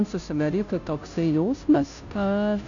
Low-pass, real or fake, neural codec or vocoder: 7.2 kHz; fake; codec, 16 kHz, 0.5 kbps, FunCodec, trained on Chinese and English, 25 frames a second